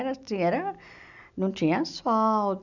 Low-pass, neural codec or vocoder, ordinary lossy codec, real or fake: 7.2 kHz; none; none; real